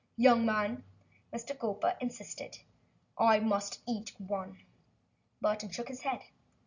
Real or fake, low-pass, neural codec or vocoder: real; 7.2 kHz; none